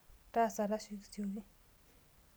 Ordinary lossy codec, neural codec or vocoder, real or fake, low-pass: none; none; real; none